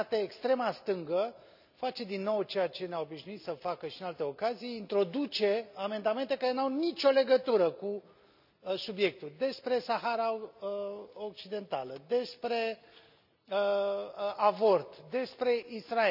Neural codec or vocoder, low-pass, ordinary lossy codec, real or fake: none; 5.4 kHz; none; real